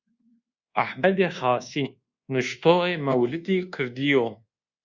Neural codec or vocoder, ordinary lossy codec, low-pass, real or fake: codec, 24 kHz, 1.2 kbps, DualCodec; Opus, 64 kbps; 7.2 kHz; fake